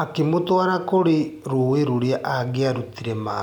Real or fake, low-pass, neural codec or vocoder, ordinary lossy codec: real; 19.8 kHz; none; none